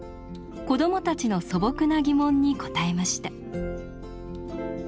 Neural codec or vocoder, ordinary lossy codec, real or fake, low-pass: none; none; real; none